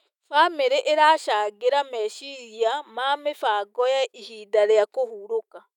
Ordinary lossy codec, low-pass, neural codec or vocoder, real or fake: none; 19.8 kHz; autoencoder, 48 kHz, 128 numbers a frame, DAC-VAE, trained on Japanese speech; fake